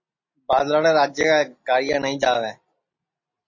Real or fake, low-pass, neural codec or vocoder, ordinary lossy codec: real; 7.2 kHz; none; MP3, 32 kbps